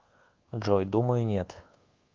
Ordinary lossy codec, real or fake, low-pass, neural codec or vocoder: Opus, 32 kbps; fake; 7.2 kHz; codec, 24 kHz, 1.2 kbps, DualCodec